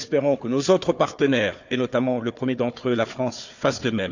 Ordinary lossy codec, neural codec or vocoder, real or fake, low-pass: none; codec, 16 kHz, 4 kbps, FunCodec, trained on Chinese and English, 50 frames a second; fake; 7.2 kHz